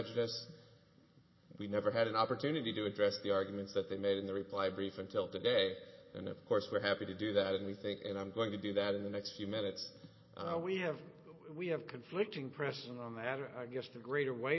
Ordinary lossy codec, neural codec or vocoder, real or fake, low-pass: MP3, 24 kbps; none; real; 7.2 kHz